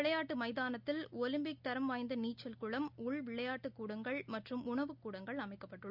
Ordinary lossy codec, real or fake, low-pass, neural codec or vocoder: Opus, 64 kbps; real; 5.4 kHz; none